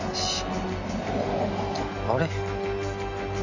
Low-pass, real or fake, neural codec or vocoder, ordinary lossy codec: 7.2 kHz; real; none; none